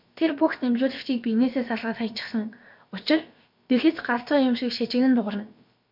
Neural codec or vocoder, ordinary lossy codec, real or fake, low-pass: codec, 16 kHz, about 1 kbps, DyCAST, with the encoder's durations; MP3, 48 kbps; fake; 5.4 kHz